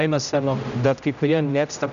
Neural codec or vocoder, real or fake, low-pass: codec, 16 kHz, 0.5 kbps, X-Codec, HuBERT features, trained on general audio; fake; 7.2 kHz